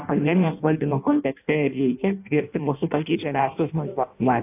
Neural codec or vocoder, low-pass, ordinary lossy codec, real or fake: codec, 16 kHz in and 24 kHz out, 0.6 kbps, FireRedTTS-2 codec; 3.6 kHz; AAC, 32 kbps; fake